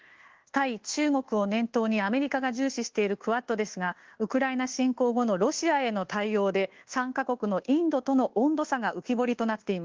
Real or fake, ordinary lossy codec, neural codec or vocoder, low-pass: fake; Opus, 16 kbps; codec, 24 kHz, 1.2 kbps, DualCodec; 7.2 kHz